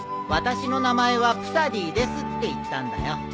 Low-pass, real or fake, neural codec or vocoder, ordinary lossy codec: none; real; none; none